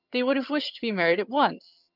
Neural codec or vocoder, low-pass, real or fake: vocoder, 22.05 kHz, 80 mel bands, HiFi-GAN; 5.4 kHz; fake